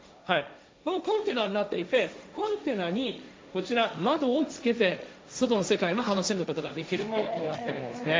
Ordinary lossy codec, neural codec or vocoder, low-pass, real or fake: none; codec, 16 kHz, 1.1 kbps, Voila-Tokenizer; none; fake